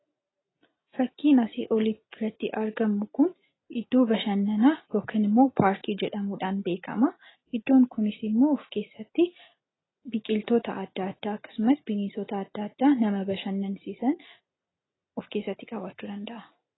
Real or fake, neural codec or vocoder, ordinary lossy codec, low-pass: real; none; AAC, 16 kbps; 7.2 kHz